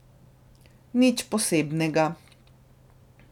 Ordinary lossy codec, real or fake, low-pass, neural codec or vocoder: none; real; 19.8 kHz; none